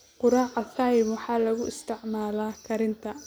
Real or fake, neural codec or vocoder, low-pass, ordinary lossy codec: real; none; none; none